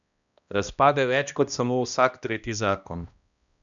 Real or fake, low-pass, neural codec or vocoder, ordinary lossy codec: fake; 7.2 kHz; codec, 16 kHz, 1 kbps, X-Codec, HuBERT features, trained on balanced general audio; none